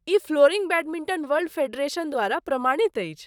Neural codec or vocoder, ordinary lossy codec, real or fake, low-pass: vocoder, 44.1 kHz, 128 mel bands, Pupu-Vocoder; none; fake; 19.8 kHz